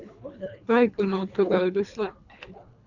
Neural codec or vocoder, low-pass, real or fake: codec, 24 kHz, 3 kbps, HILCodec; 7.2 kHz; fake